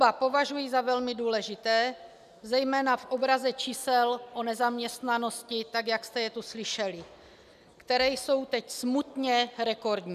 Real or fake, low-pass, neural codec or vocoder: real; 14.4 kHz; none